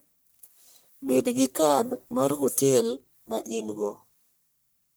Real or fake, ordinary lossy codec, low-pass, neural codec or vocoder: fake; none; none; codec, 44.1 kHz, 1.7 kbps, Pupu-Codec